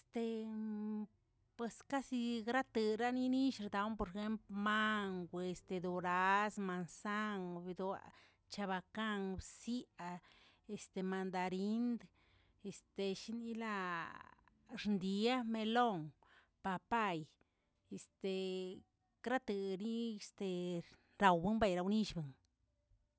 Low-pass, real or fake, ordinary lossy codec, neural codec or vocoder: none; real; none; none